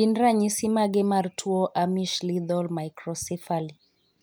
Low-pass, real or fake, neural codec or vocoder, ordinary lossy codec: none; real; none; none